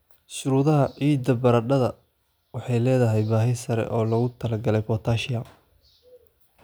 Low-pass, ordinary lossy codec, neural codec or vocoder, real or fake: none; none; none; real